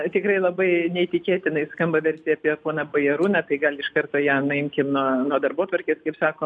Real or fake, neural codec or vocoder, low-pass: real; none; 9.9 kHz